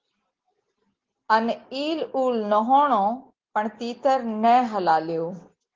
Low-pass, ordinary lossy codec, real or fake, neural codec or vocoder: 7.2 kHz; Opus, 16 kbps; real; none